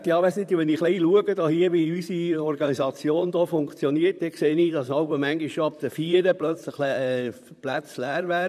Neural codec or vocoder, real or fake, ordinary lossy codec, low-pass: vocoder, 44.1 kHz, 128 mel bands, Pupu-Vocoder; fake; none; 14.4 kHz